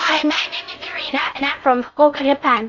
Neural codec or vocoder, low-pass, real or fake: codec, 16 kHz in and 24 kHz out, 0.6 kbps, FocalCodec, streaming, 4096 codes; 7.2 kHz; fake